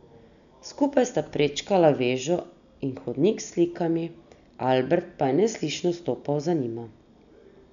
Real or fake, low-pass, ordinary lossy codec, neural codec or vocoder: real; 7.2 kHz; none; none